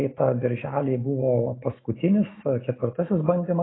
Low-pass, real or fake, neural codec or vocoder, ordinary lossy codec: 7.2 kHz; real; none; AAC, 16 kbps